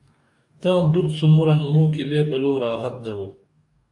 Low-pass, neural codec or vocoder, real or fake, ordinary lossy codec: 10.8 kHz; codec, 44.1 kHz, 2.6 kbps, DAC; fake; AAC, 64 kbps